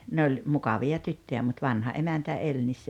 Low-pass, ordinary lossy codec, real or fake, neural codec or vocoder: 19.8 kHz; none; real; none